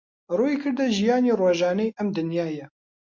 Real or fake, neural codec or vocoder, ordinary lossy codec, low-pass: real; none; MP3, 48 kbps; 7.2 kHz